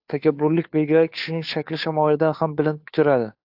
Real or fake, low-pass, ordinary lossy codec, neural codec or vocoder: fake; 5.4 kHz; AAC, 48 kbps; codec, 16 kHz, 2 kbps, FunCodec, trained on Chinese and English, 25 frames a second